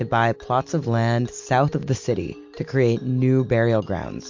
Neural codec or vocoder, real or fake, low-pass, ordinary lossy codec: none; real; 7.2 kHz; MP3, 48 kbps